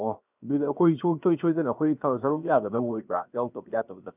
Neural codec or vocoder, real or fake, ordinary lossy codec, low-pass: codec, 16 kHz, 0.7 kbps, FocalCodec; fake; none; 3.6 kHz